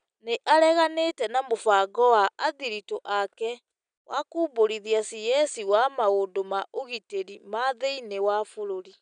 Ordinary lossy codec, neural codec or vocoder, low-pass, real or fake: none; none; 9.9 kHz; real